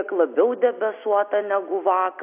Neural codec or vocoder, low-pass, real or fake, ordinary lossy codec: none; 3.6 kHz; real; AAC, 32 kbps